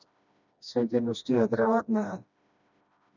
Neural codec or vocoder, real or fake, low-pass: codec, 16 kHz, 1 kbps, FreqCodec, smaller model; fake; 7.2 kHz